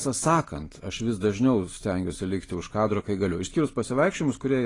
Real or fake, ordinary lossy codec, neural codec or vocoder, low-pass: real; AAC, 32 kbps; none; 10.8 kHz